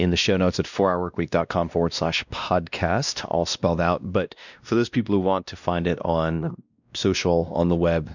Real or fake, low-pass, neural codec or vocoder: fake; 7.2 kHz; codec, 16 kHz, 1 kbps, X-Codec, WavLM features, trained on Multilingual LibriSpeech